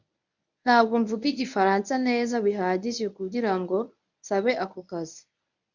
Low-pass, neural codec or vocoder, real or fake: 7.2 kHz; codec, 24 kHz, 0.9 kbps, WavTokenizer, medium speech release version 1; fake